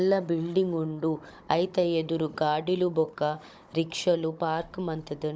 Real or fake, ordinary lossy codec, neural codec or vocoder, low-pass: fake; none; codec, 16 kHz, 16 kbps, FunCodec, trained on Chinese and English, 50 frames a second; none